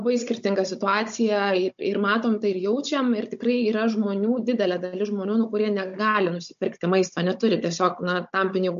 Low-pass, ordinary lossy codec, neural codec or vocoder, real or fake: 7.2 kHz; MP3, 48 kbps; codec, 16 kHz, 16 kbps, FunCodec, trained on Chinese and English, 50 frames a second; fake